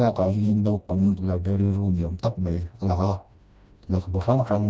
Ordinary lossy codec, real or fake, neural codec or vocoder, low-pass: none; fake; codec, 16 kHz, 1 kbps, FreqCodec, smaller model; none